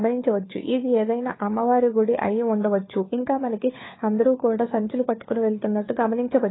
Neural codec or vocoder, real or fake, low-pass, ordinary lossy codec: codec, 16 kHz, 4 kbps, FunCodec, trained on LibriTTS, 50 frames a second; fake; 7.2 kHz; AAC, 16 kbps